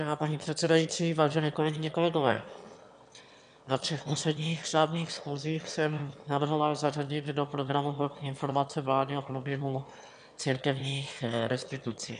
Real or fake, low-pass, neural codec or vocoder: fake; 9.9 kHz; autoencoder, 22.05 kHz, a latent of 192 numbers a frame, VITS, trained on one speaker